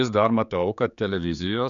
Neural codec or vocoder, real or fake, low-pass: codec, 16 kHz, 4 kbps, X-Codec, HuBERT features, trained on general audio; fake; 7.2 kHz